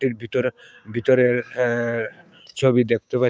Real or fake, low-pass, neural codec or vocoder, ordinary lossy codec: fake; none; codec, 16 kHz, 6 kbps, DAC; none